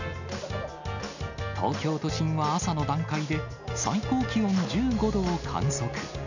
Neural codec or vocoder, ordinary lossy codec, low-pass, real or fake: none; none; 7.2 kHz; real